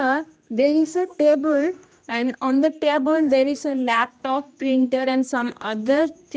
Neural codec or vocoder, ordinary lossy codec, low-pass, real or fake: codec, 16 kHz, 1 kbps, X-Codec, HuBERT features, trained on general audio; none; none; fake